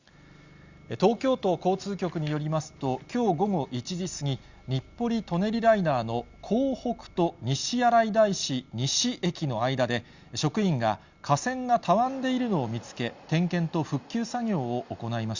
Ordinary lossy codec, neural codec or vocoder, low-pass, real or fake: Opus, 64 kbps; none; 7.2 kHz; real